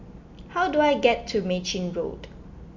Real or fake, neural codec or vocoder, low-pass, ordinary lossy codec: real; none; 7.2 kHz; AAC, 48 kbps